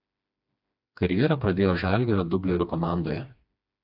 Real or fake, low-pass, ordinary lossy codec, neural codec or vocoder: fake; 5.4 kHz; AAC, 48 kbps; codec, 16 kHz, 2 kbps, FreqCodec, smaller model